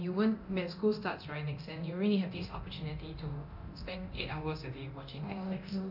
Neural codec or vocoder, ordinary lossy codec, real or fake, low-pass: codec, 24 kHz, 0.9 kbps, DualCodec; none; fake; 5.4 kHz